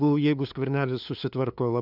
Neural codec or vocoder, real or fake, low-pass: codec, 16 kHz, 2 kbps, FunCodec, trained on LibriTTS, 25 frames a second; fake; 5.4 kHz